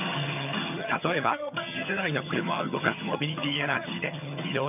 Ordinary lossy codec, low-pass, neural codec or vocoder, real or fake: none; 3.6 kHz; vocoder, 22.05 kHz, 80 mel bands, HiFi-GAN; fake